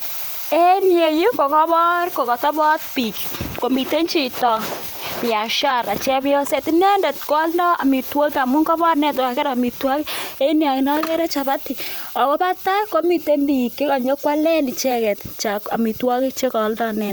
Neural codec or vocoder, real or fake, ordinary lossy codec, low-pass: vocoder, 44.1 kHz, 128 mel bands, Pupu-Vocoder; fake; none; none